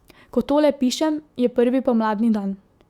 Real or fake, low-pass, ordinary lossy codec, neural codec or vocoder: fake; 19.8 kHz; Opus, 64 kbps; autoencoder, 48 kHz, 128 numbers a frame, DAC-VAE, trained on Japanese speech